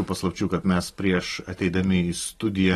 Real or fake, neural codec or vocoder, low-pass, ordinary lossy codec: real; none; 19.8 kHz; AAC, 32 kbps